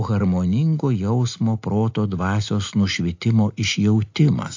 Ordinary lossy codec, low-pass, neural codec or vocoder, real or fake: AAC, 48 kbps; 7.2 kHz; none; real